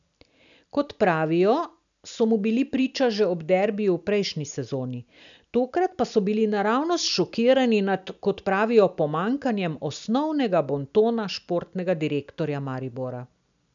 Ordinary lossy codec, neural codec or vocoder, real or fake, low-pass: none; none; real; 7.2 kHz